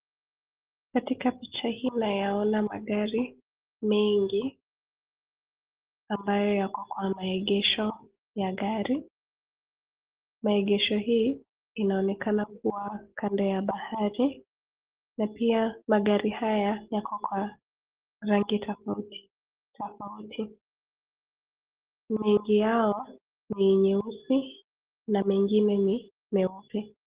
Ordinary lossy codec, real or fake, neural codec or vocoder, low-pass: Opus, 16 kbps; real; none; 3.6 kHz